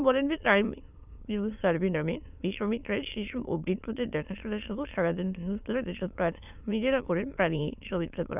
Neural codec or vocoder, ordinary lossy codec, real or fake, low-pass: autoencoder, 22.05 kHz, a latent of 192 numbers a frame, VITS, trained on many speakers; none; fake; 3.6 kHz